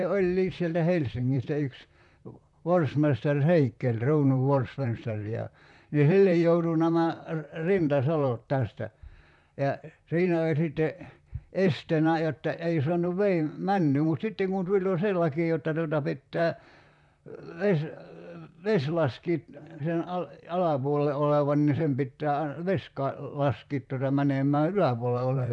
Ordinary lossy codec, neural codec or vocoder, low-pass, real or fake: none; vocoder, 44.1 kHz, 128 mel bands, Pupu-Vocoder; 10.8 kHz; fake